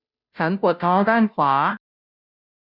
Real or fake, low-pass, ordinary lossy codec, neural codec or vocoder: fake; 5.4 kHz; none; codec, 16 kHz, 0.5 kbps, FunCodec, trained on Chinese and English, 25 frames a second